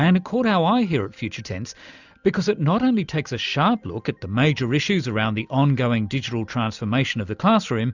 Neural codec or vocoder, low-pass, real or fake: none; 7.2 kHz; real